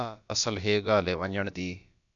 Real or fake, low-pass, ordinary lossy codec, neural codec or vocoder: fake; 7.2 kHz; MP3, 96 kbps; codec, 16 kHz, about 1 kbps, DyCAST, with the encoder's durations